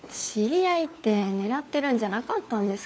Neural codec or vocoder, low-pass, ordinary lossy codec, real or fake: codec, 16 kHz, 4 kbps, FunCodec, trained on LibriTTS, 50 frames a second; none; none; fake